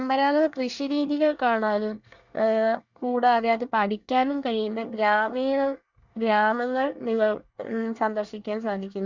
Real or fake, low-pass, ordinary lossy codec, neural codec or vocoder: fake; 7.2 kHz; Opus, 64 kbps; codec, 24 kHz, 1 kbps, SNAC